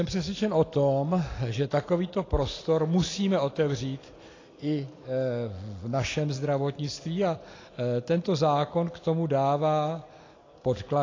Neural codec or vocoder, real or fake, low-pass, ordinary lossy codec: none; real; 7.2 kHz; AAC, 32 kbps